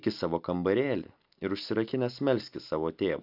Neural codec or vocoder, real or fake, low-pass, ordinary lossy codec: none; real; 5.4 kHz; AAC, 48 kbps